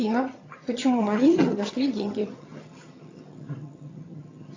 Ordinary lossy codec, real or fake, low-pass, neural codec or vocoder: AAC, 32 kbps; fake; 7.2 kHz; vocoder, 22.05 kHz, 80 mel bands, HiFi-GAN